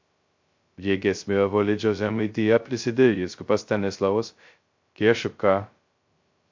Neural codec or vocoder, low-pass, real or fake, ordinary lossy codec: codec, 16 kHz, 0.2 kbps, FocalCodec; 7.2 kHz; fake; MP3, 48 kbps